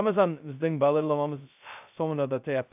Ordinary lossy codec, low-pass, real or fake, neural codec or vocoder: AAC, 32 kbps; 3.6 kHz; fake; codec, 16 kHz, 0.2 kbps, FocalCodec